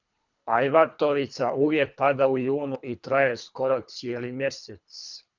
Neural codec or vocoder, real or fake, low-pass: codec, 24 kHz, 3 kbps, HILCodec; fake; 7.2 kHz